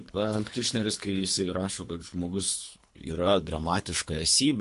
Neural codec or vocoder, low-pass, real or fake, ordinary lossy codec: codec, 24 kHz, 3 kbps, HILCodec; 10.8 kHz; fake; MP3, 64 kbps